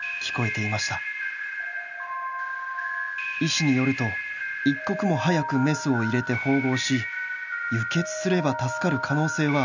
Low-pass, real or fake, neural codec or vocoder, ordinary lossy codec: 7.2 kHz; real; none; none